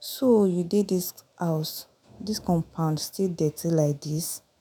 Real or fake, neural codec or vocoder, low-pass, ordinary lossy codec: fake; autoencoder, 48 kHz, 128 numbers a frame, DAC-VAE, trained on Japanese speech; none; none